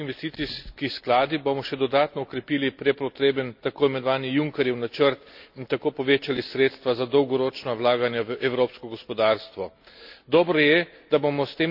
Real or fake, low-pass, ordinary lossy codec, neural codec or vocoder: real; 5.4 kHz; none; none